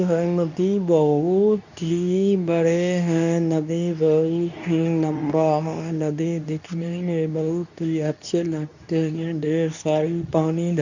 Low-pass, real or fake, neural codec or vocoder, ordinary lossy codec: 7.2 kHz; fake; codec, 24 kHz, 0.9 kbps, WavTokenizer, medium speech release version 2; none